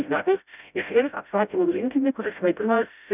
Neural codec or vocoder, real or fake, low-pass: codec, 16 kHz, 0.5 kbps, FreqCodec, smaller model; fake; 3.6 kHz